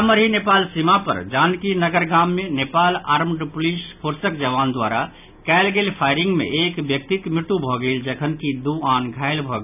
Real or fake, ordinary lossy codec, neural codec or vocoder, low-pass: real; none; none; 3.6 kHz